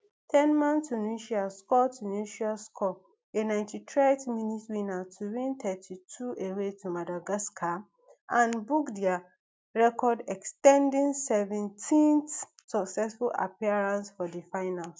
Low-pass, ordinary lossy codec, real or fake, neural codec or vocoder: none; none; real; none